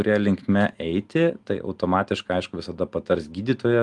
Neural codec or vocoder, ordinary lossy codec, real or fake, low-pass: none; Opus, 32 kbps; real; 10.8 kHz